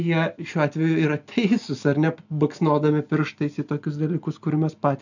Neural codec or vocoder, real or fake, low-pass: none; real; 7.2 kHz